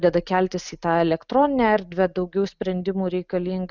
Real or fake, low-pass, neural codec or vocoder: real; 7.2 kHz; none